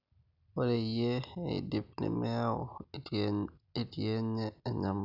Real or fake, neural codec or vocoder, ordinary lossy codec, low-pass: real; none; none; 5.4 kHz